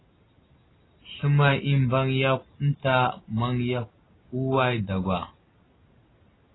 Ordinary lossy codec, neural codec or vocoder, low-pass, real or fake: AAC, 16 kbps; none; 7.2 kHz; real